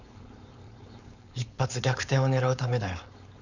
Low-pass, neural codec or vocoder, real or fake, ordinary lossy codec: 7.2 kHz; codec, 16 kHz, 4.8 kbps, FACodec; fake; none